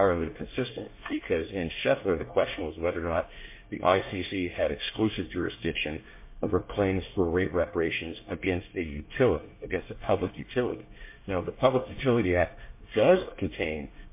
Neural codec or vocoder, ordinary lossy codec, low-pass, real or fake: codec, 24 kHz, 1 kbps, SNAC; MP3, 24 kbps; 3.6 kHz; fake